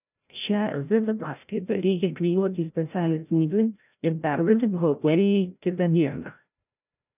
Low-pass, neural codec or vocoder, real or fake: 3.6 kHz; codec, 16 kHz, 0.5 kbps, FreqCodec, larger model; fake